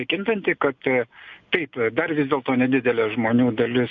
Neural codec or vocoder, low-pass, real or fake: none; 7.2 kHz; real